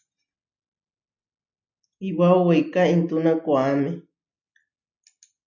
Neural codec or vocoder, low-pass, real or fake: none; 7.2 kHz; real